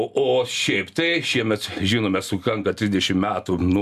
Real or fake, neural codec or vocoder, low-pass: real; none; 14.4 kHz